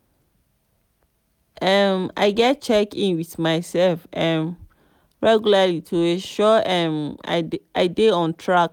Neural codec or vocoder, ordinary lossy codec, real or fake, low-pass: none; none; real; 19.8 kHz